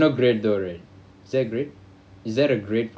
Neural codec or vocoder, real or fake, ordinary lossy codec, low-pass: none; real; none; none